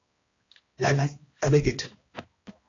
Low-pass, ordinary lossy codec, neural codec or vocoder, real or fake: 7.2 kHz; AAC, 32 kbps; codec, 16 kHz, 1 kbps, X-Codec, HuBERT features, trained on balanced general audio; fake